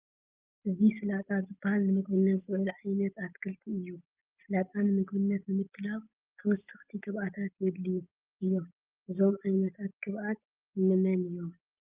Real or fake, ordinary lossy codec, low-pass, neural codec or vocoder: real; Opus, 32 kbps; 3.6 kHz; none